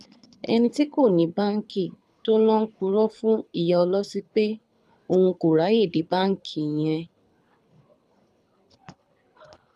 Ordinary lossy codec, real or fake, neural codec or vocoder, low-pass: none; fake; codec, 24 kHz, 6 kbps, HILCodec; none